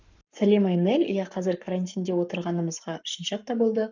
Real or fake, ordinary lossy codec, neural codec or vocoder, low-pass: fake; none; codec, 44.1 kHz, 7.8 kbps, Pupu-Codec; 7.2 kHz